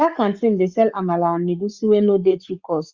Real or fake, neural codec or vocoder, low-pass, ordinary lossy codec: fake; codec, 44.1 kHz, 7.8 kbps, Pupu-Codec; 7.2 kHz; Opus, 64 kbps